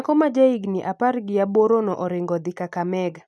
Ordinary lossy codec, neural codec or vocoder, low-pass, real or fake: none; none; none; real